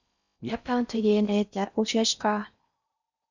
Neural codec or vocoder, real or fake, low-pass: codec, 16 kHz in and 24 kHz out, 0.6 kbps, FocalCodec, streaming, 4096 codes; fake; 7.2 kHz